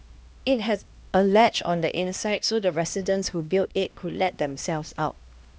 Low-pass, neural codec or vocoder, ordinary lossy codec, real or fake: none; codec, 16 kHz, 1 kbps, X-Codec, HuBERT features, trained on LibriSpeech; none; fake